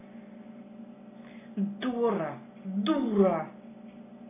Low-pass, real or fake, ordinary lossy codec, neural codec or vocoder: 3.6 kHz; real; AAC, 16 kbps; none